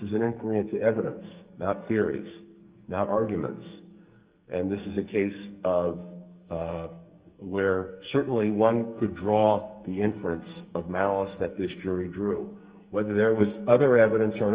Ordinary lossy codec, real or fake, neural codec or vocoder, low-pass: Opus, 24 kbps; fake; codec, 44.1 kHz, 2.6 kbps, SNAC; 3.6 kHz